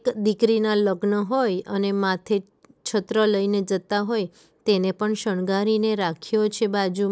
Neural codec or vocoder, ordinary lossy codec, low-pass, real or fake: none; none; none; real